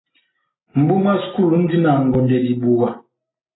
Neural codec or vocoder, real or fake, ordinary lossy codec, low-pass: none; real; AAC, 16 kbps; 7.2 kHz